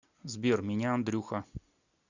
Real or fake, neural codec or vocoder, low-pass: real; none; 7.2 kHz